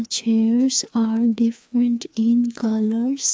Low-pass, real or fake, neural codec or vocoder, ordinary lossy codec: none; fake; codec, 16 kHz, 2 kbps, FreqCodec, larger model; none